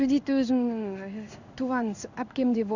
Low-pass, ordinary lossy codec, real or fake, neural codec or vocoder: 7.2 kHz; none; fake; codec, 16 kHz in and 24 kHz out, 1 kbps, XY-Tokenizer